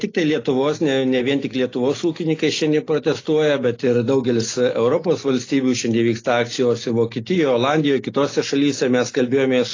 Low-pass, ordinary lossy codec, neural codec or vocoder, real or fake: 7.2 kHz; AAC, 32 kbps; none; real